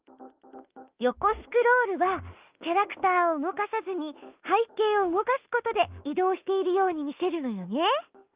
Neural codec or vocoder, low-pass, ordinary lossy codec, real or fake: autoencoder, 48 kHz, 32 numbers a frame, DAC-VAE, trained on Japanese speech; 3.6 kHz; Opus, 32 kbps; fake